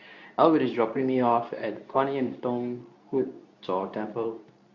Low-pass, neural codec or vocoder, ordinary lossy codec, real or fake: 7.2 kHz; codec, 24 kHz, 0.9 kbps, WavTokenizer, medium speech release version 2; Opus, 64 kbps; fake